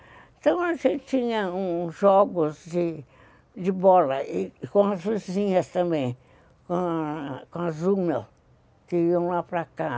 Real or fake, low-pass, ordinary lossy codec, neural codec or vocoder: real; none; none; none